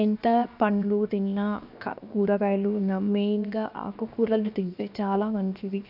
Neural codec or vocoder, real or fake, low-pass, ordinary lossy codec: codec, 16 kHz, 0.7 kbps, FocalCodec; fake; 5.4 kHz; none